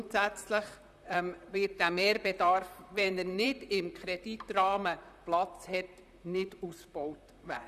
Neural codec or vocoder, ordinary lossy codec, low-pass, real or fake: vocoder, 44.1 kHz, 128 mel bands, Pupu-Vocoder; none; 14.4 kHz; fake